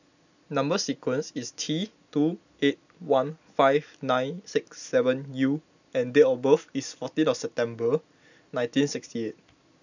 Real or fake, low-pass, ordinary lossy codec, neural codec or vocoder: real; 7.2 kHz; none; none